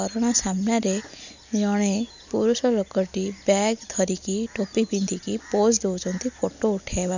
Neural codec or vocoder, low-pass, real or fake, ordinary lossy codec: none; 7.2 kHz; real; none